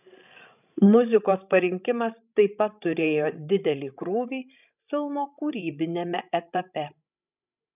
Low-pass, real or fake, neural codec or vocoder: 3.6 kHz; fake; codec, 16 kHz, 16 kbps, FreqCodec, larger model